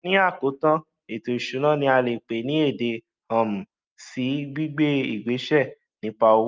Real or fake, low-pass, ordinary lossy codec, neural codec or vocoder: real; 7.2 kHz; Opus, 24 kbps; none